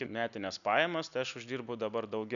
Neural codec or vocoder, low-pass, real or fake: none; 7.2 kHz; real